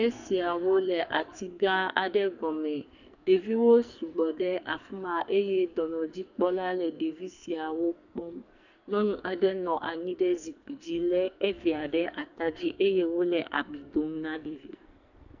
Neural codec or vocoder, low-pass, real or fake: codec, 44.1 kHz, 2.6 kbps, SNAC; 7.2 kHz; fake